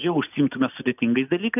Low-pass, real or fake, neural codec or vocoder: 3.6 kHz; real; none